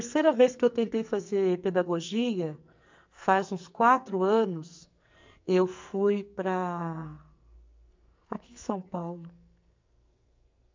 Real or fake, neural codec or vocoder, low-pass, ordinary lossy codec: fake; codec, 44.1 kHz, 2.6 kbps, SNAC; 7.2 kHz; MP3, 64 kbps